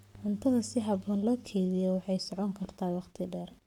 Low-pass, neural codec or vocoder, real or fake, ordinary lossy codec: 19.8 kHz; codec, 44.1 kHz, 7.8 kbps, Pupu-Codec; fake; none